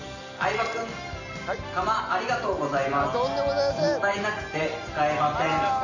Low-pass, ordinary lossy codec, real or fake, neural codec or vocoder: 7.2 kHz; none; real; none